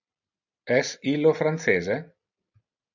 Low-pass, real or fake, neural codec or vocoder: 7.2 kHz; real; none